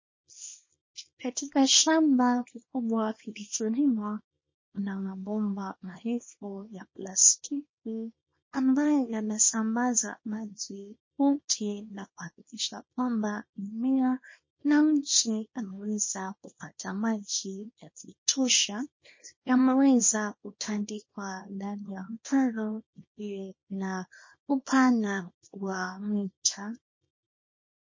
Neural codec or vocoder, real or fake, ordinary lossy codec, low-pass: codec, 24 kHz, 0.9 kbps, WavTokenizer, small release; fake; MP3, 32 kbps; 7.2 kHz